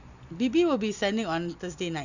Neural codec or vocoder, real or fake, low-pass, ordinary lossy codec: none; real; 7.2 kHz; none